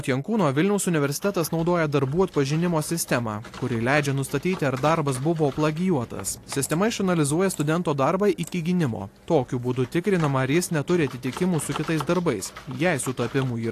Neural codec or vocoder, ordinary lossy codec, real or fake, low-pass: none; AAC, 64 kbps; real; 14.4 kHz